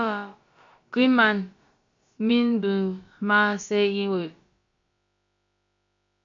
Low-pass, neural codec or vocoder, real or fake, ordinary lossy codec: 7.2 kHz; codec, 16 kHz, about 1 kbps, DyCAST, with the encoder's durations; fake; MP3, 48 kbps